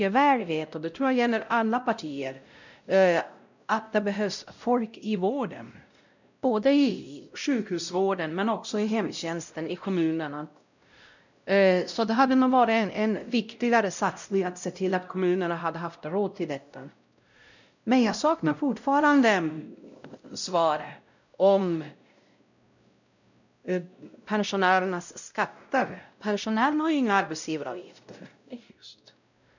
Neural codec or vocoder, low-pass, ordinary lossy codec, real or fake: codec, 16 kHz, 0.5 kbps, X-Codec, WavLM features, trained on Multilingual LibriSpeech; 7.2 kHz; none; fake